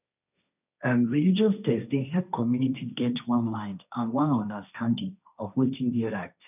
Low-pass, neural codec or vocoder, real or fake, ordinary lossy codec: 3.6 kHz; codec, 16 kHz, 1.1 kbps, Voila-Tokenizer; fake; none